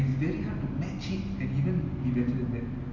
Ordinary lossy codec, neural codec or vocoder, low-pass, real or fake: Opus, 64 kbps; none; 7.2 kHz; real